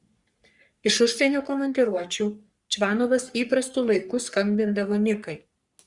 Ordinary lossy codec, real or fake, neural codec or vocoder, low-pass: Opus, 64 kbps; fake; codec, 44.1 kHz, 3.4 kbps, Pupu-Codec; 10.8 kHz